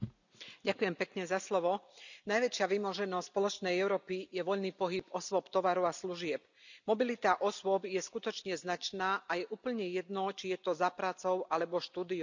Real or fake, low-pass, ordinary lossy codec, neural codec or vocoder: real; 7.2 kHz; none; none